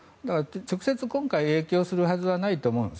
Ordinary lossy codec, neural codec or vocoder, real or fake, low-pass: none; none; real; none